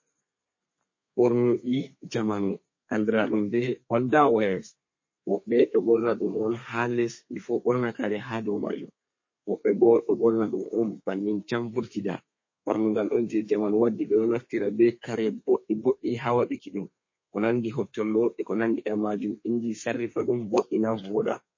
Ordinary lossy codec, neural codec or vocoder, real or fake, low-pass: MP3, 32 kbps; codec, 32 kHz, 1.9 kbps, SNAC; fake; 7.2 kHz